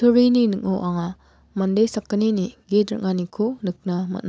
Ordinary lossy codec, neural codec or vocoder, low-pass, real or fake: none; none; none; real